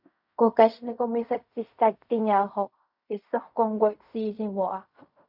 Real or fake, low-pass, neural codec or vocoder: fake; 5.4 kHz; codec, 16 kHz in and 24 kHz out, 0.4 kbps, LongCat-Audio-Codec, fine tuned four codebook decoder